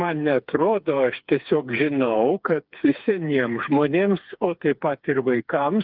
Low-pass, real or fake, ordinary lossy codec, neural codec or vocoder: 5.4 kHz; fake; Opus, 24 kbps; codec, 16 kHz, 4 kbps, FreqCodec, smaller model